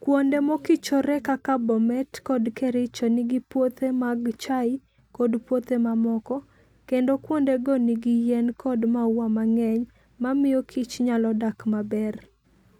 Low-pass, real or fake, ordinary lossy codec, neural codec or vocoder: 19.8 kHz; real; none; none